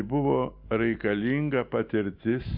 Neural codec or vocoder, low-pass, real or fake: none; 5.4 kHz; real